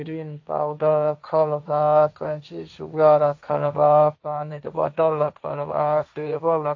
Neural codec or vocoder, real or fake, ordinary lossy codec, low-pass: codec, 16 kHz, 1.1 kbps, Voila-Tokenizer; fake; AAC, 48 kbps; 7.2 kHz